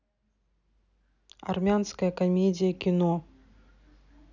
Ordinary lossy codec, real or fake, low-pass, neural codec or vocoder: none; real; 7.2 kHz; none